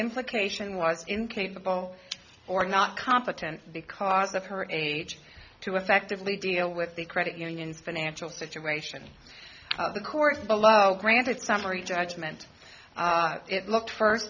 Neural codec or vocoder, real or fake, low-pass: none; real; 7.2 kHz